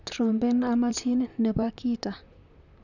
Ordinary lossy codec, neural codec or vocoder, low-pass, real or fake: none; vocoder, 44.1 kHz, 128 mel bands, Pupu-Vocoder; 7.2 kHz; fake